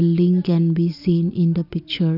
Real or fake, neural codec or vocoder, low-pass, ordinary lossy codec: real; none; 5.4 kHz; none